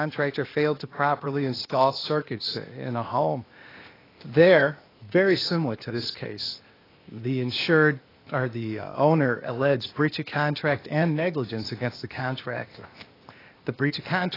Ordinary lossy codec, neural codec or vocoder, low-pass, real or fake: AAC, 24 kbps; codec, 16 kHz, 0.8 kbps, ZipCodec; 5.4 kHz; fake